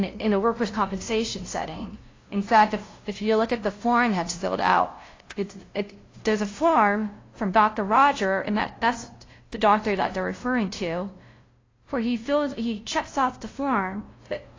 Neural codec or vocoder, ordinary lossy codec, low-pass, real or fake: codec, 16 kHz, 0.5 kbps, FunCodec, trained on LibriTTS, 25 frames a second; AAC, 32 kbps; 7.2 kHz; fake